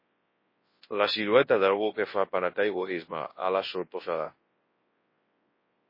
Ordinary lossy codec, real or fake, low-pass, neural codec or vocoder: MP3, 24 kbps; fake; 5.4 kHz; codec, 24 kHz, 0.9 kbps, WavTokenizer, large speech release